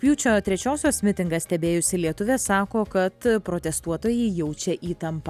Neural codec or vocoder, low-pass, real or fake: none; 14.4 kHz; real